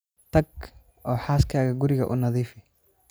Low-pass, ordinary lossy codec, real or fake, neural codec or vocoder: none; none; real; none